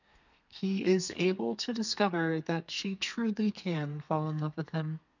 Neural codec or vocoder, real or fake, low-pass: codec, 32 kHz, 1.9 kbps, SNAC; fake; 7.2 kHz